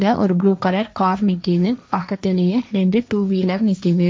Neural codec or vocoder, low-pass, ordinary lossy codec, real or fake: codec, 16 kHz, 1.1 kbps, Voila-Tokenizer; none; none; fake